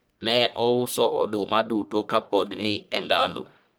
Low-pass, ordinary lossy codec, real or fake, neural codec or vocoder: none; none; fake; codec, 44.1 kHz, 1.7 kbps, Pupu-Codec